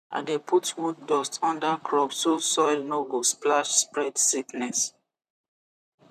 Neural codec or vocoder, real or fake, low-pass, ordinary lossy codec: vocoder, 44.1 kHz, 128 mel bands, Pupu-Vocoder; fake; 14.4 kHz; none